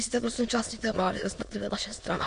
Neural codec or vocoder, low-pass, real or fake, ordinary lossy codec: autoencoder, 22.05 kHz, a latent of 192 numbers a frame, VITS, trained on many speakers; 9.9 kHz; fake; MP3, 48 kbps